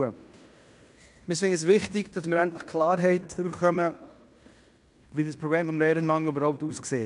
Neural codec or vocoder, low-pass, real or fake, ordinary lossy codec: codec, 16 kHz in and 24 kHz out, 0.9 kbps, LongCat-Audio-Codec, fine tuned four codebook decoder; 10.8 kHz; fake; none